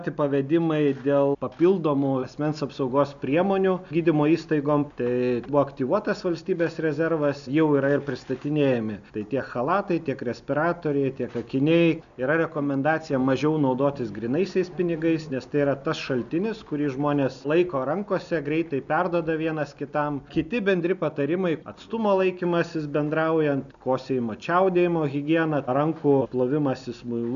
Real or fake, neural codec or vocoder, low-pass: real; none; 7.2 kHz